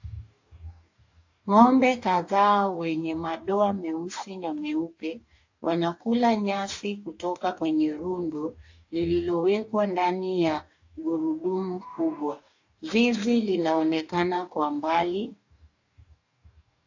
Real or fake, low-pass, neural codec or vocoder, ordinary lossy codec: fake; 7.2 kHz; codec, 44.1 kHz, 2.6 kbps, DAC; AAC, 48 kbps